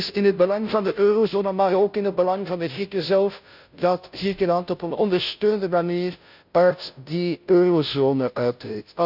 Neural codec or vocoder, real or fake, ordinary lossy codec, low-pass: codec, 16 kHz, 0.5 kbps, FunCodec, trained on Chinese and English, 25 frames a second; fake; none; 5.4 kHz